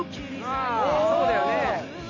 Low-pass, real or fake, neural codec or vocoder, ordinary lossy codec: 7.2 kHz; real; none; AAC, 32 kbps